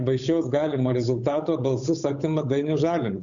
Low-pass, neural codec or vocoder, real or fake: 7.2 kHz; codec, 16 kHz, 8 kbps, FunCodec, trained on Chinese and English, 25 frames a second; fake